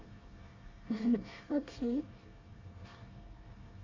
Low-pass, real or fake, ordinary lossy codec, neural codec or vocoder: 7.2 kHz; fake; MP3, 64 kbps; codec, 24 kHz, 1 kbps, SNAC